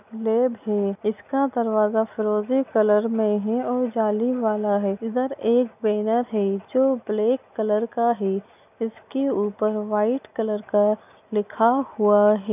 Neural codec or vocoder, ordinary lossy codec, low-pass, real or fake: none; none; 3.6 kHz; real